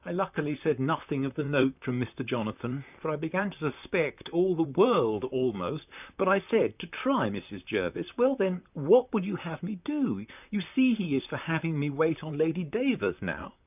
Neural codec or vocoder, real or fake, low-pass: vocoder, 44.1 kHz, 128 mel bands, Pupu-Vocoder; fake; 3.6 kHz